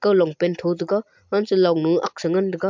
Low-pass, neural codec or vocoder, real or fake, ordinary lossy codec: 7.2 kHz; none; real; MP3, 64 kbps